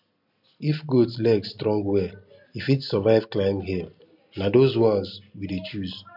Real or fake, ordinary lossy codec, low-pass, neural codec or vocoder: real; none; 5.4 kHz; none